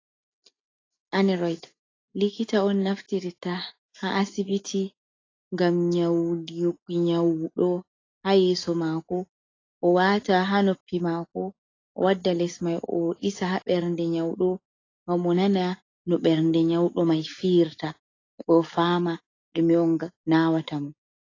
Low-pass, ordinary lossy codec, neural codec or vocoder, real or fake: 7.2 kHz; AAC, 32 kbps; none; real